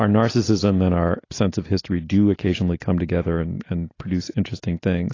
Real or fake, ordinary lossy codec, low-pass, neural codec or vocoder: real; AAC, 32 kbps; 7.2 kHz; none